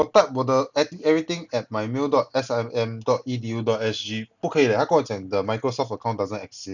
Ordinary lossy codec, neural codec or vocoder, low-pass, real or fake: none; none; 7.2 kHz; real